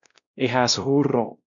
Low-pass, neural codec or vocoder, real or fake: 7.2 kHz; codec, 16 kHz, 1 kbps, X-Codec, WavLM features, trained on Multilingual LibriSpeech; fake